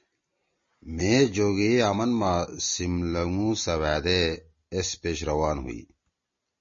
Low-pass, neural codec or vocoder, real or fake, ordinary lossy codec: 7.2 kHz; none; real; MP3, 32 kbps